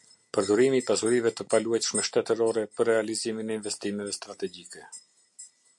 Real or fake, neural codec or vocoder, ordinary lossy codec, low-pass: real; none; MP3, 64 kbps; 10.8 kHz